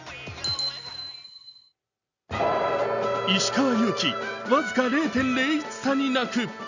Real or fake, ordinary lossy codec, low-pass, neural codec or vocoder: real; none; 7.2 kHz; none